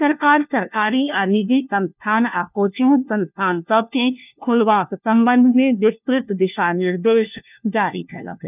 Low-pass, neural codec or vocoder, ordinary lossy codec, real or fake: 3.6 kHz; codec, 16 kHz, 1 kbps, FunCodec, trained on LibriTTS, 50 frames a second; none; fake